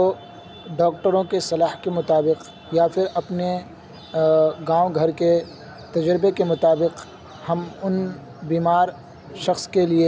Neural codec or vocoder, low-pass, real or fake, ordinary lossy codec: none; none; real; none